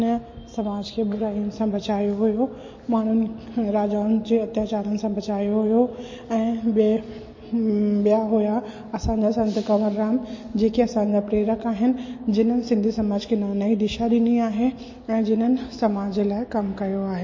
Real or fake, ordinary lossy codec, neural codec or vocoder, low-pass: real; MP3, 32 kbps; none; 7.2 kHz